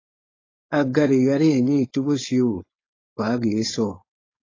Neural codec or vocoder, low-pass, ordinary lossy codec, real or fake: codec, 16 kHz, 4.8 kbps, FACodec; 7.2 kHz; AAC, 32 kbps; fake